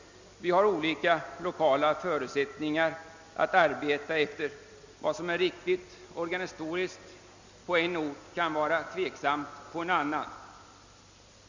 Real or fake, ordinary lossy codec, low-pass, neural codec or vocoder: real; Opus, 64 kbps; 7.2 kHz; none